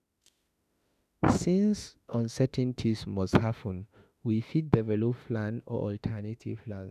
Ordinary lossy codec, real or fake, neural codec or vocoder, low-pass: none; fake; autoencoder, 48 kHz, 32 numbers a frame, DAC-VAE, trained on Japanese speech; 14.4 kHz